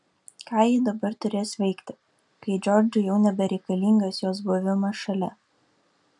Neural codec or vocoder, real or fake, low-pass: none; real; 10.8 kHz